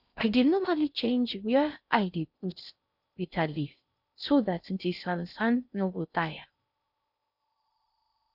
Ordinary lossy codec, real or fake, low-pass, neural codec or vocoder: none; fake; 5.4 kHz; codec, 16 kHz in and 24 kHz out, 0.6 kbps, FocalCodec, streaming, 4096 codes